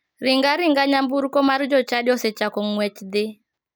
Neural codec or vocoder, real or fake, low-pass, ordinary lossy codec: none; real; none; none